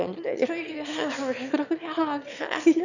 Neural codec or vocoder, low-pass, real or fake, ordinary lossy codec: autoencoder, 22.05 kHz, a latent of 192 numbers a frame, VITS, trained on one speaker; 7.2 kHz; fake; none